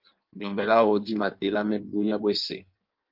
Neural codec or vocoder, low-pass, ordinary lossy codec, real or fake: codec, 16 kHz in and 24 kHz out, 1.1 kbps, FireRedTTS-2 codec; 5.4 kHz; Opus, 24 kbps; fake